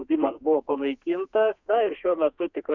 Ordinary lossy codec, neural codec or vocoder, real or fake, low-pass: Opus, 64 kbps; codec, 32 kHz, 1.9 kbps, SNAC; fake; 7.2 kHz